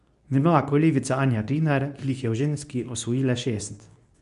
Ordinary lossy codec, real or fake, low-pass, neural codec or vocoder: none; fake; 10.8 kHz; codec, 24 kHz, 0.9 kbps, WavTokenizer, medium speech release version 2